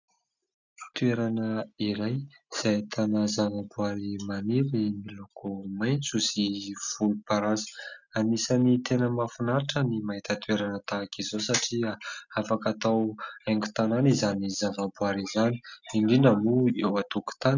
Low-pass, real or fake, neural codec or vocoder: 7.2 kHz; real; none